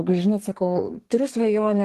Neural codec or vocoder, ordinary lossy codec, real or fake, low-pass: codec, 32 kHz, 1.9 kbps, SNAC; Opus, 16 kbps; fake; 14.4 kHz